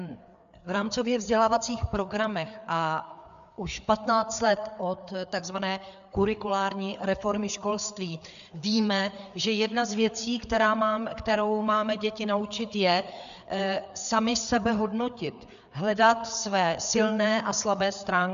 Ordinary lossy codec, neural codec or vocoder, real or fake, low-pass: MP3, 96 kbps; codec, 16 kHz, 4 kbps, FreqCodec, larger model; fake; 7.2 kHz